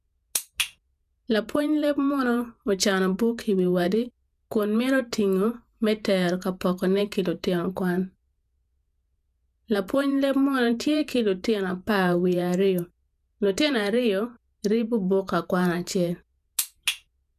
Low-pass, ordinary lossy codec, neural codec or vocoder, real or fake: 14.4 kHz; none; vocoder, 48 kHz, 128 mel bands, Vocos; fake